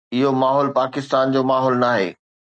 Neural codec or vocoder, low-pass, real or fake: none; 9.9 kHz; real